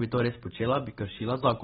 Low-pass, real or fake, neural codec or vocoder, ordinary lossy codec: 19.8 kHz; real; none; AAC, 16 kbps